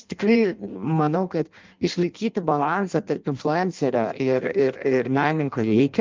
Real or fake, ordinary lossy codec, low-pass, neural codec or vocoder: fake; Opus, 24 kbps; 7.2 kHz; codec, 16 kHz in and 24 kHz out, 0.6 kbps, FireRedTTS-2 codec